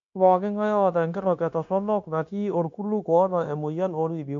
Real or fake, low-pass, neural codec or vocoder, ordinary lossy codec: fake; 7.2 kHz; codec, 16 kHz, 0.9 kbps, LongCat-Audio-Codec; MP3, 64 kbps